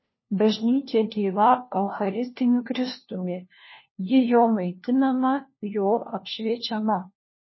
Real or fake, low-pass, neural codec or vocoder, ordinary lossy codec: fake; 7.2 kHz; codec, 16 kHz, 1 kbps, FunCodec, trained on LibriTTS, 50 frames a second; MP3, 24 kbps